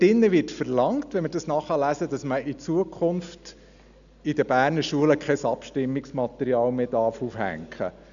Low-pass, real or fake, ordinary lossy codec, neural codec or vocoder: 7.2 kHz; real; none; none